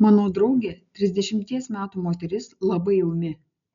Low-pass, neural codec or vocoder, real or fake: 7.2 kHz; none; real